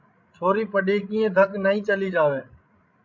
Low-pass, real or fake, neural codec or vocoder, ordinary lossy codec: 7.2 kHz; fake; codec, 16 kHz, 16 kbps, FreqCodec, larger model; MP3, 48 kbps